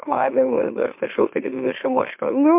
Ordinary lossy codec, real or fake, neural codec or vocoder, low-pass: MP3, 32 kbps; fake; autoencoder, 44.1 kHz, a latent of 192 numbers a frame, MeloTTS; 3.6 kHz